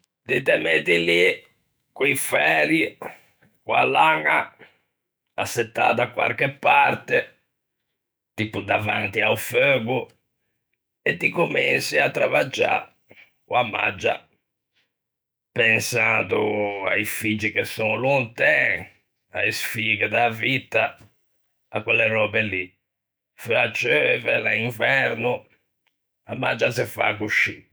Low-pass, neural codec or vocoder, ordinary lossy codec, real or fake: none; autoencoder, 48 kHz, 128 numbers a frame, DAC-VAE, trained on Japanese speech; none; fake